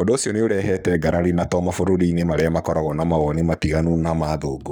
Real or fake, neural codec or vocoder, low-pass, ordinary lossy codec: fake; codec, 44.1 kHz, 7.8 kbps, Pupu-Codec; none; none